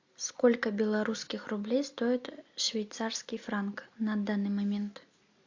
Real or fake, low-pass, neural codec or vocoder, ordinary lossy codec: real; 7.2 kHz; none; AAC, 48 kbps